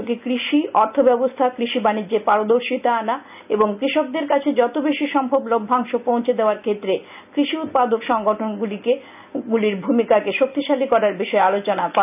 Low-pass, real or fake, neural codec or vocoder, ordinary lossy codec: 3.6 kHz; real; none; none